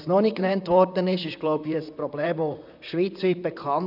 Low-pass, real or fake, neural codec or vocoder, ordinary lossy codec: 5.4 kHz; fake; vocoder, 44.1 kHz, 128 mel bands, Pupu-Vocoder; none